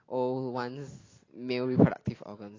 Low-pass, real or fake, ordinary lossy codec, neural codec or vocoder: 7.2 kHz; real; AAC, 32 kbps; none